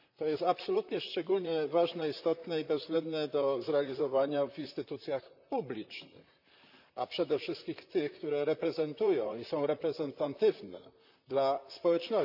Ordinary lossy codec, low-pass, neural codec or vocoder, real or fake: none; 5.4 kHz; vocoder, 44.1 kHz, 128 mel bands, Pupu-Vocoder; fake